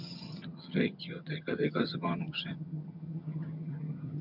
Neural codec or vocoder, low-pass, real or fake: vocoder, 22.05 kHz, 80 mel bands, HiFi-GAN; 5.4 kHz; fake